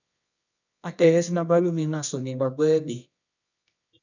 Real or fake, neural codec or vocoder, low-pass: fake; codec, 24 kHz, 0.9 kbps, WavTokenizer, medium music audio release; 7.2 kHz